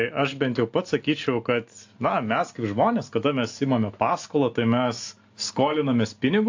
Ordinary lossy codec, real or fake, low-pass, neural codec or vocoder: AAC, 48 kbps; real; 7.2 kHz; none